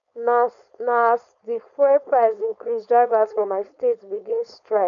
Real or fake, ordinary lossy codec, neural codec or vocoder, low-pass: fake; none; codec, 16 kHz, 4.8 kbps, FACodec; 7.2 kHz